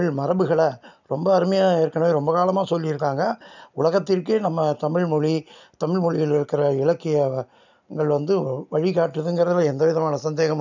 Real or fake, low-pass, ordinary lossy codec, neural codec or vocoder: real; 7.2 kHz; none; none